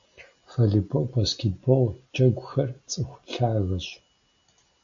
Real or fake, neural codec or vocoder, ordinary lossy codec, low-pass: real; none; AAC, 64 kbps; 7.2 kHz